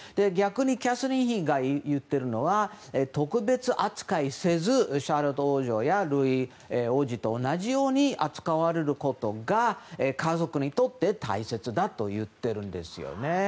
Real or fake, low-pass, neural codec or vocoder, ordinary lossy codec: real; none; none; none